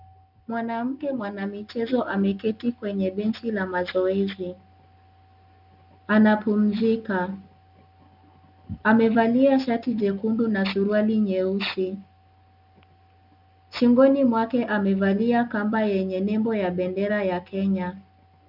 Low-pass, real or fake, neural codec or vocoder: 5.4 kHz; real; none